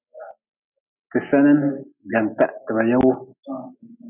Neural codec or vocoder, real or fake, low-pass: none; real; 3.6 kHz